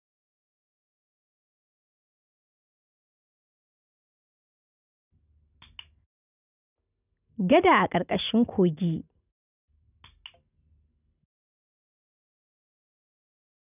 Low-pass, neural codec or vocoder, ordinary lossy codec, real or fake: 3.6 kHz; none; none; real